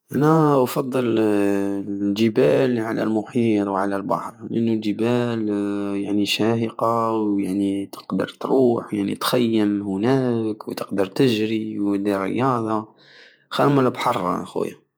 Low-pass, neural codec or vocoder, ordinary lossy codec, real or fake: none; vocoder, 48 kHz, 128 mel bands, Vocos; none; fake